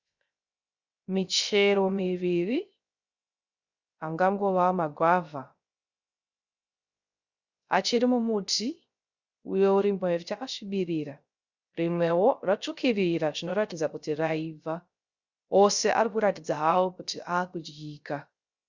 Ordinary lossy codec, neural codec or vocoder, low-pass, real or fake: Opus, 64 kbps; codec, 16 kHz, 0.3 kbps, FocalCodec; 7.2 kHz; fake